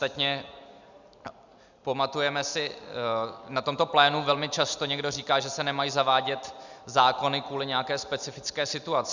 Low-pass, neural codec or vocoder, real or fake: 7.2 kHz; none; real